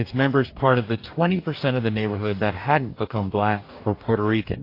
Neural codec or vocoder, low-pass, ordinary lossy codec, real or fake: codec, 44.1 kHz, 2.6 kbps, DAC; 5.4 kHz; AAC, 32 kbps; fake